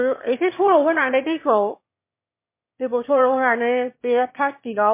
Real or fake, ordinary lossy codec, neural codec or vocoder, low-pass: fake; MP3, 24 kbps; autoencoder, 22.05 kHz, a latent of 192 numbers a frame, VITS, trained on one speaker; 3.6 kHz